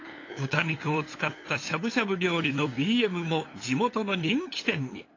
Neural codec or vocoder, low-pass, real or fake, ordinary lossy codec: codec, 16 kHz, 8 kbps, FunCodec, trained on LibriTTS, 25 frames a second; 7.2 kHz; fake; AAC, 32 kbps